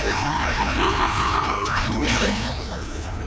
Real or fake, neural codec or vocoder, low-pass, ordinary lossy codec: fake; codec, 16 kHz, 1 kbps, FreqCodec, larger model; none; none